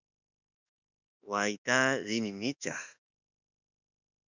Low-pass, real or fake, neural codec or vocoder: 7.2 kHz; fake; autoencoder, 48 kHz, 32 numbers a frame, DAC-VAE, trained on Japanese speech